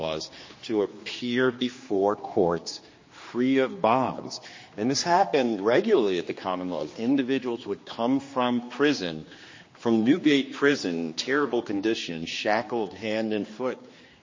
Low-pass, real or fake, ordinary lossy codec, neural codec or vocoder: 7.2 kHz; fake; MP3, 32 kbps; codec, 16 kHz, 2 kbps, X-Codec, HuBERT features, trained on general audio